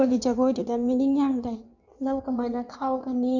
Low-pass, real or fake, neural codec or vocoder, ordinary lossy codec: 7.2 kHz; fake; codec, 16 kHz in and 24 kHz out, 1.1 kbps, FireRedTTS-2 codec; none